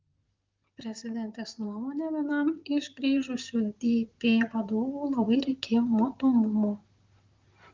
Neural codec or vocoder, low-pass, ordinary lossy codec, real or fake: vocoder, 44.1 kHz, 128 mel bands, Pupu-Vocoder; 7.2 kHz; Opus, 32 kbps; fake